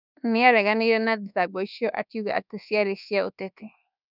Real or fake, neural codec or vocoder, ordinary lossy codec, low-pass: fake; codec, 24 kHz, 1.2 kbps, DualCodec; none; 5.4 kHz